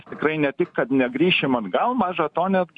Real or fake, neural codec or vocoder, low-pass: real; none; 10.8 kHz